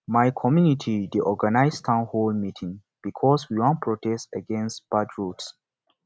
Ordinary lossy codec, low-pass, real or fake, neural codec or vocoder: none; none; real; none